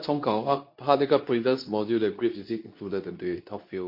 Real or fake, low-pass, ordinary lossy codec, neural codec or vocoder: fake; 5.4 kHz; MP3, 32 kbps; codec, 24 kHz, 0.9 kbps, WavTokenizer, medium speech release version 2